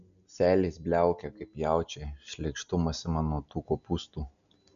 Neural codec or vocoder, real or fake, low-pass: none; real; 7.2 kHz